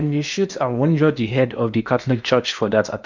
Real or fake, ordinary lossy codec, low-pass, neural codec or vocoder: fake; none; 7.2 kHz; codec, 16 kHz in and 24 kHz out, 0.8 kbps, FocalCodec, streaming, 65536 codes